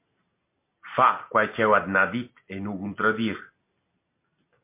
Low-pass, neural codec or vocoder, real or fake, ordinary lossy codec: 3.6 kHz; none; real; MP3, 24 kbps